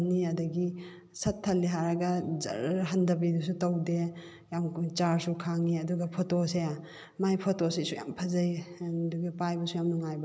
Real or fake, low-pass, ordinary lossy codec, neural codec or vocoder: real; none; none; none